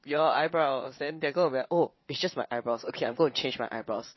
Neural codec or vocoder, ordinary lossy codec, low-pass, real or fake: vocoder, 22.05 kHz, 80 mel bands, WaveNeXt; MP3, 24 kbps; 7.2 kHz; fake